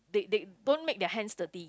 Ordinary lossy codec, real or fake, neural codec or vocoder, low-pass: none; real; none; none